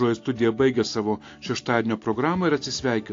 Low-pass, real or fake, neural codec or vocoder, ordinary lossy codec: 7.2 kHz; real; none; AAC, 48 kbps